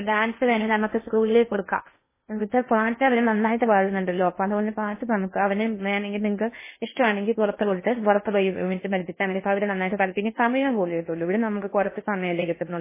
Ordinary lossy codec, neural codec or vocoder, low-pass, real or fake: MP3, 16 kbps; codec, 16 kHz in and 24 kHz out, 0.6 kbps, FocalCodec, streaming, 2048 codes; 3.6 kHz; fake